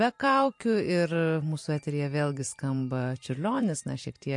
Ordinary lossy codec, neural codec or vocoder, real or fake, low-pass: MP3, 48 kbps; vocoder, 44.1 kHz, 128 mel bands every 512 samples, BigVGAN v2; fake; 10.8 kHz